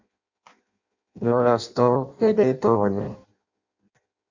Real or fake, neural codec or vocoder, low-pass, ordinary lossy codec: fake; codec, 16 kHz in and 24 kHz out, 0.6 kbps, FireRedTTS-2 codec; 7.2 kHz; Opus, 64 kbps